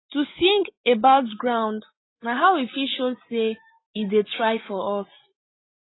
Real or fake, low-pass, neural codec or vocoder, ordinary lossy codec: real; 7.2 kHz; none; AAC, 16 kbps